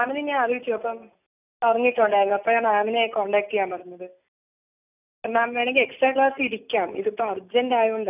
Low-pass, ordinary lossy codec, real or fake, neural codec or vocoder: 3.6 kHz; none; real; none